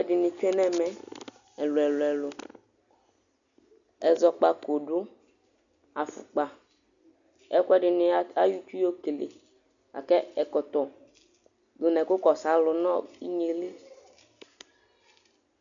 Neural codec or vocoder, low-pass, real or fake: none; 7.2 kHz; real